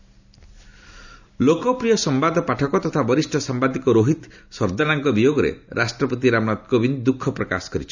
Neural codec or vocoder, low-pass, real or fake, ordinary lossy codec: none; 7.2 kHz; real; none